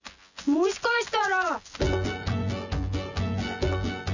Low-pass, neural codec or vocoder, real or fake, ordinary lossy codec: 7.2 kHz; vocoder, 24 kHz, 100 mel bands, Vocos; fake; none